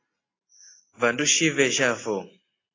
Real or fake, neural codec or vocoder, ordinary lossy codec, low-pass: real; none; AAC, 32 kbps; 7.2 kHz